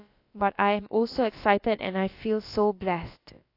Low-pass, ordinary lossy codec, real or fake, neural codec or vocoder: 5.4 kHz; AAC, 32 kbps; fake; codec, 16 kHz, about 1 kbps, DyCAST, with the encoder's durations